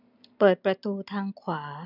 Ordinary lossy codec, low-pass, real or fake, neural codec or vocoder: none; 5.4 kHz; real; none